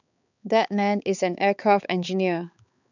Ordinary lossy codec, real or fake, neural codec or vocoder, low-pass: none; fake; codec, 16 kHz, 4 kbps, X-Codec, HuBERT features, trained on balanced general audio; 7.2 kHz